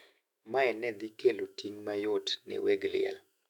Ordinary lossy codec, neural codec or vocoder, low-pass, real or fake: none; autoencoder, 48 kHz, 128 numbers a frame, DAC-VAE, trained on Japanese speech; 19.8 kHz; fake